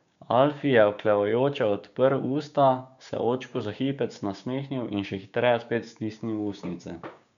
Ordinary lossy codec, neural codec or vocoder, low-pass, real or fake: none; codec, 16 kHz, 6 kbps, DAC; 7.2 kHz; fake